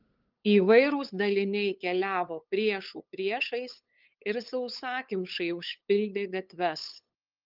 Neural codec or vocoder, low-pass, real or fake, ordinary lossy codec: codec, 16 kHz, 8 kbps, FunCodec, trained on LibriTTS, 25 frames a second; 5.4 kHz; fake; Opus, 32 kbps